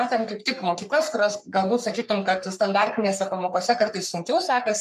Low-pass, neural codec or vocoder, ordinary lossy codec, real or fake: 14.4 kHz; codec, 44.1 kHz, 3.4 kbps, Pupu-Codec; MP3, 96 kbps; fake